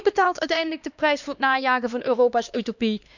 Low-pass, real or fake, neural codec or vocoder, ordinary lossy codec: 7.2 kHz; fake; codec, 16 kHz, 2 kbps, X-Codec, HuBERT features, trained on LibriSpeech; none